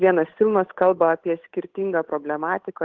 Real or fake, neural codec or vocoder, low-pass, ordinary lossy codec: fake; codec, 16 kHz, 8 kbps, FunCodec, trained on Chinese and English, 25 frames a second; 7.2 kHz; Opus, 16 kbps